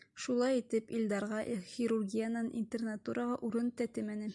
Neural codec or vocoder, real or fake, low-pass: none; real; 10.8 kHz